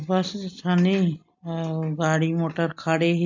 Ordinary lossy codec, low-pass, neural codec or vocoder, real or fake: MP3, 64 kbps; 7.2 kHz; none; real